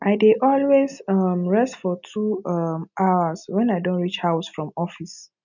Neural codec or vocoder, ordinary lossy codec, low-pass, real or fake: none; none; 7.2 kHz; real